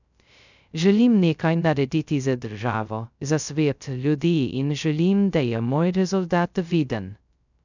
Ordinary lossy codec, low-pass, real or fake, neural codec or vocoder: none; 7.2 kHz; fake; codec, 16 kHz, 0.2 kbps, FocalCodec